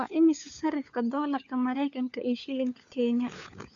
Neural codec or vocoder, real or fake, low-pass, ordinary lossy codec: codec, 16 kHz, 4 kbps, FunCodec, trained on LibriTTS, 50 frames a second; fake; 7.2 kHz; none